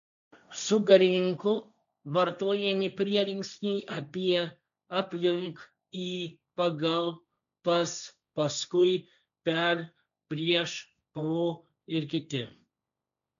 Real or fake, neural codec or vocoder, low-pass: fake; codec, 16 kHz, 1.1 kbps, Voila-Tokenizer; 7.2 kHz